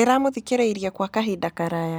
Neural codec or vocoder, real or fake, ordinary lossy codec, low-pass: none; real; none; none